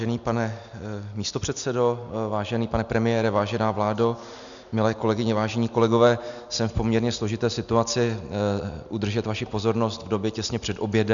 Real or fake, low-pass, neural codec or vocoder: real; 7.2 kHz; none